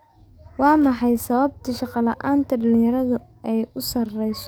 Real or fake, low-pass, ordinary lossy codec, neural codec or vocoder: fake; none; none; codec, 44.1 kHz, 7.8 kbps, DAC